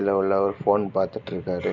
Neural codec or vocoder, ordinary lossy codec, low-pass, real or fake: none; none; 7.2 kHz; real